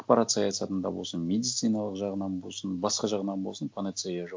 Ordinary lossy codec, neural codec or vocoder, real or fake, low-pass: none; none; real; none